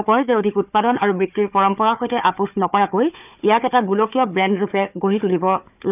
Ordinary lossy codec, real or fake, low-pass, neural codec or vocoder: none; fake; 3.6 kHz; codec, 16 kHz, 4 kbps, FunCodec, trained on Chinese and English, 50 frames a second